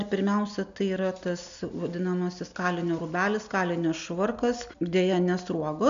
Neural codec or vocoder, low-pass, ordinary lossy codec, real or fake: none; 7.2 kHz; MP3, 64 kbps; real